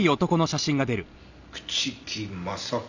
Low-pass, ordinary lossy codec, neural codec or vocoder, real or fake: 7.2 kHz; none; none; real